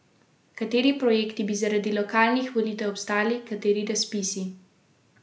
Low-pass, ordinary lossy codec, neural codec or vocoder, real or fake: none; none; none; real